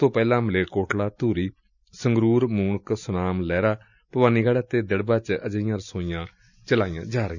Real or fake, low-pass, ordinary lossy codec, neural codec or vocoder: real; 7.2 kHz; none; none